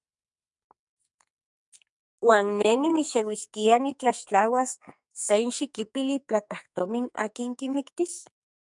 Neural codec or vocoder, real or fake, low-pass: codec, 44.1 kHz, 2.6 kbps, SNAC; fake; 10.8 kHz